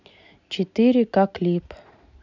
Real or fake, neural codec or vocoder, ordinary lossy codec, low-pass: real; none; none; 7.2 kHz